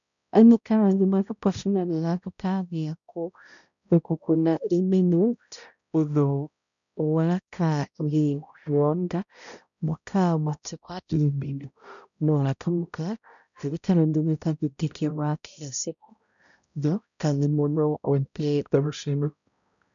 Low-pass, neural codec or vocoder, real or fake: 7.2 kHz; codec, 16 kHz, 0.5 kbps, X-Codec, HuBERT features, trained on balanced general audio; fake